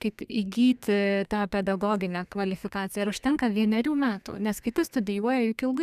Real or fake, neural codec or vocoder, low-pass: fake; codec, 32 kHz, 1.9 kbps, SNAC; 14.4 kHz